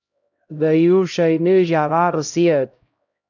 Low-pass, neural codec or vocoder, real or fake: 7.2 kHz; codec, 16 kHz, 0.5 kbps, X-Codec, HuBERT features, trained on LibriSpeech; fake